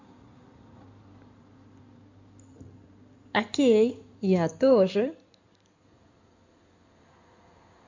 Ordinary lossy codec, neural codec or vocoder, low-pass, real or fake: MP3, 64 kbps; codec, 16 kHz in and 24 kHz out, 2.2 kbps, FireRedTTS-2 codec; 7.2 kHz; fake